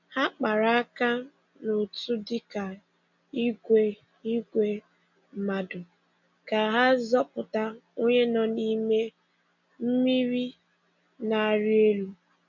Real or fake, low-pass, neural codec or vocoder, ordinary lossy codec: real; 7.2 kHz; none; none